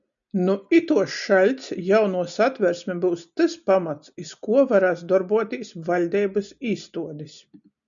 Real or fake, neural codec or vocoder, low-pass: real; none; 7.2 kHz